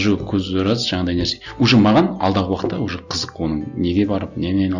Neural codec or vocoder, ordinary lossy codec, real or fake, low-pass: none; none; real; 7.2 kHz